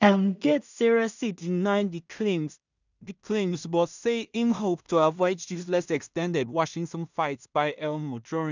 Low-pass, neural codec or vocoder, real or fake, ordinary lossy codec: 7.2 kHz; codec, 16 kHz in and 24 kHz out, 0.4 kbps, LongCat-Audio-Codec, two codebook decoder; fake; none